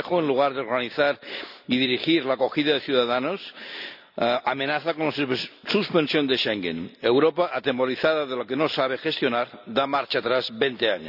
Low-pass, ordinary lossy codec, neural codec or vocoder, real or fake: 5.4 kHz; none; none; real